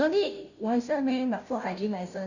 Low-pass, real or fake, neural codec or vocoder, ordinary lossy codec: 7.2 kHz; fake; codec, 16 kHz, 0.5 kbps, FunCodec, trained on Chinese and English, 25 frames a second; none